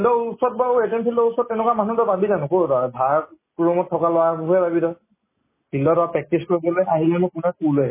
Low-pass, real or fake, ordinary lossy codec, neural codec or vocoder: 3.6 kHz; real; MP3, 16 kbps; none